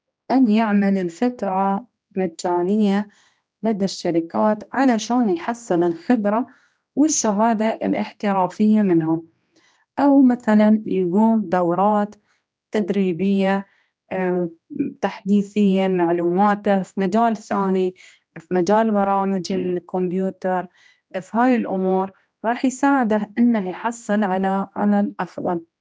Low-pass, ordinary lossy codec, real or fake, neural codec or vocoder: none; none; fake; codec, 16 kHz, 1 kbps, X-Codec, HuBERT features, trained on general audio